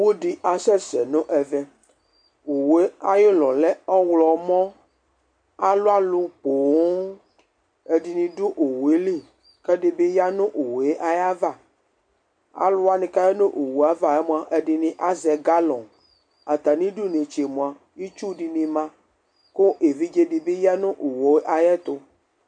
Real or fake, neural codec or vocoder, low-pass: real; none; 9.9 kHz